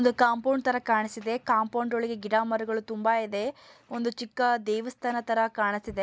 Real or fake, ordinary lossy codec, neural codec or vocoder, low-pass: real; none; none; none